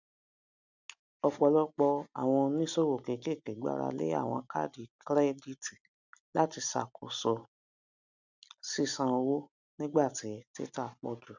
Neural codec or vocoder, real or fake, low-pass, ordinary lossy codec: none; real; 7.2 kHz; none